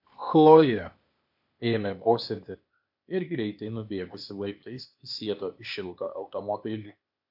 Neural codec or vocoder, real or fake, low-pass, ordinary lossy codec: codec, 16 kHz, 0.8 kbps, ZipCodec; fake; 5.4 kHz; MP3, 48 kbps